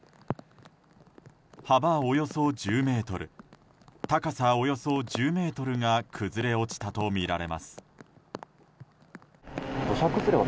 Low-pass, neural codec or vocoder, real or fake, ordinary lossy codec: none; none; real; none